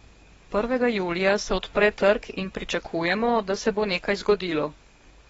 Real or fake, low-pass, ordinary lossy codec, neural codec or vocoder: fake; 19.8 kHz; AAC, 24 kbps; codec, 44.1 kHz, 7.8 kbps, DAC